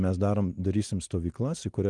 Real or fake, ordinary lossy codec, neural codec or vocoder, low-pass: real; Opus, 24 kbps; none; 10.8 kHz